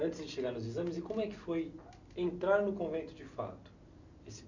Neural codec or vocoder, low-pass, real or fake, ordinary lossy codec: none; 7.2 kHz; real; none